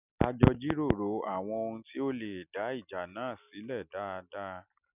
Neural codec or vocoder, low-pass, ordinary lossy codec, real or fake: none; 3.6 kHz; none; real